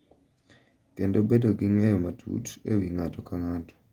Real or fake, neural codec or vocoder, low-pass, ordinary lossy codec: fake; vocoder, 48 kHz, 128 mel bands, Vocos; 19.8 kHz; Opus, 24 kbps